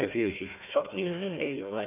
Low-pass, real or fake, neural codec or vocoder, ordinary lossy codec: 3.6 kHz; fake; codec, 16 kHz, 1 kbps, FunCodec, trained on Chinese and English, 50 frames a second; none